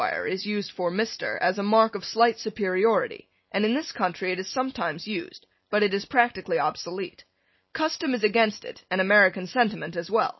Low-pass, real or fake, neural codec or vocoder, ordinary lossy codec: 7.2 kHz; real; none; MP3, 24 kbps